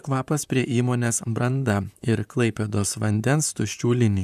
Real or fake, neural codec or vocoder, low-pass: fake; vocoder, 44.1 kHz, 128 mel bands, Pupu-Vocoder; 14.4 kHz